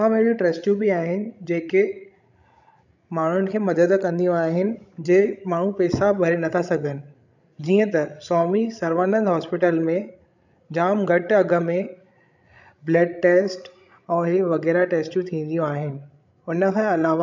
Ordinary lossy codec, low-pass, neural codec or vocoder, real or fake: none; 7.2 kHz; codec, 16 kHz, 16 kbps, FreqCodec, larger model; fake